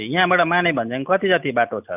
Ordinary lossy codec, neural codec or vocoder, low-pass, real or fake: none; none; 3.6 kHz; real